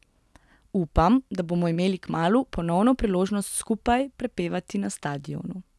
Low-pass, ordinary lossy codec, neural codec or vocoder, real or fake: none; none; none; real